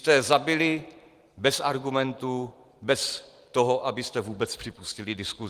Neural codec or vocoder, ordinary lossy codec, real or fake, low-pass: vocoder, 44.1 kHz, 128 mel bands every 512 samples, BigVGAN v2; Opus, 24 kbps; fake; 14.4 kHz